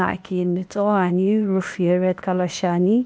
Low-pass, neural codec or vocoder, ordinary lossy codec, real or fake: none; codec, 16 kHz, 0.8 kbps, ZipCodec; none; fake